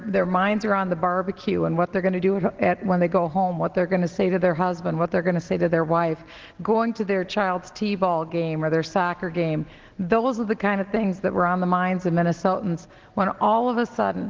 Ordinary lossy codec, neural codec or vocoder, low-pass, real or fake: Opus, 16 kbps; none; 7.2 kHz; real